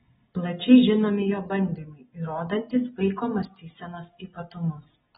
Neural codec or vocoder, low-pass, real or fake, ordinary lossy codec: none; 19.8 kHz; real; AAC, 16 kbps